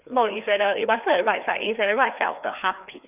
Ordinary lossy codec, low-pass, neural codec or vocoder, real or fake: none; 3.6 kHz; codec, 16 kHz, 2 kbps, FreqCodec, larger model; fake